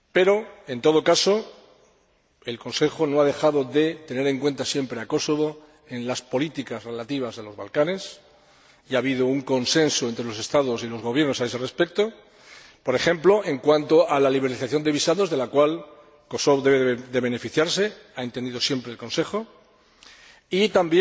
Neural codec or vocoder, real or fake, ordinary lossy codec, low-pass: none; real; none; none